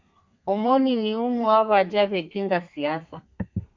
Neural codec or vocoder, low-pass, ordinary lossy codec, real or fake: codec, 32 kHz, 1.9 kbps, SNAC; 7.2 kHz; MP3, 48 kbps; fake